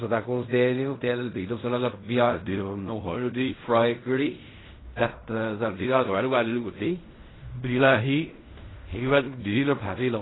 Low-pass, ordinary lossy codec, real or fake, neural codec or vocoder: 7.2 kHz; AAC, 16 kbps; fake; codec, 16 kHz in and 24 kHz out, 0.4 kbps, LongCat-Audio-Codec, fine tuned four codebook decoder